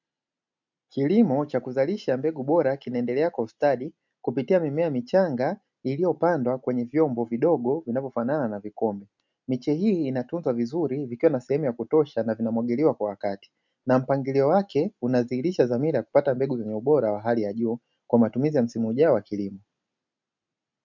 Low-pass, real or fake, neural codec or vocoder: 7.2 kHz; real; none